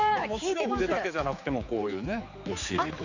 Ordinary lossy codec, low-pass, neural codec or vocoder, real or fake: none; 7.2 kHz; codec, 16 kHz, 4 kbps, X-Codec, HuBERT features, trained on general audio; fake